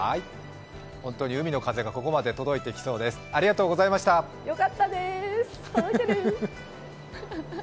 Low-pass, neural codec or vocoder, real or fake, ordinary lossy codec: none; none; real; none